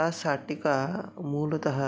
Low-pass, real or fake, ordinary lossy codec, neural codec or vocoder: none; real; none; none